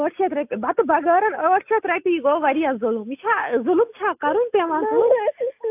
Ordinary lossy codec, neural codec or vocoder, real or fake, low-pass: AAC, 32 kbps; none; real; 3.6 kHz